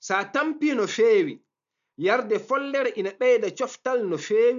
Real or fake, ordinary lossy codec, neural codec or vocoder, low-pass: real; MP3, 96 kbps; none; 7.2 kHz